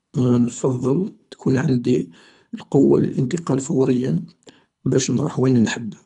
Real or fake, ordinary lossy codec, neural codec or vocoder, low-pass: fake; none; codec, 24 kHz, 3 kbps, HILCodec; 10.8 kHz